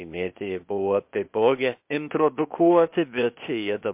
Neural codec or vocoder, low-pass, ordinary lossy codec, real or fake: codec, 16 kHz, 0.8 kbps, ZipCodec; 3.6 kHz; MP3, 32 kbps; fake